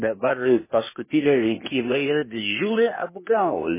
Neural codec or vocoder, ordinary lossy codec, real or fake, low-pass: codec, 16 kHz, 0.8 kbps, ZipCodec; MP3, 16 kbps; fake; 3.6 kHz